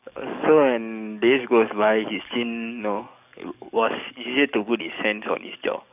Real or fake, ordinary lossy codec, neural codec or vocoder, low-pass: fake; none; codec, 44.1 kHz, 7.8 kbps, DAC; 3.6 kHz